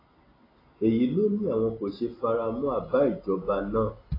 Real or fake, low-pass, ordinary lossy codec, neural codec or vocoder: real; 5.4 kHz; AAC, 24 kbps; none